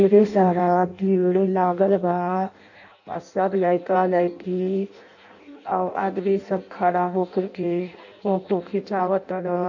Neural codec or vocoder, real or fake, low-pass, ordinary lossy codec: codec, 16 kHz in and 24 kHz out, 0.6 kbps, FireRedTTS-2 codec; fake; 7.2 kHz; none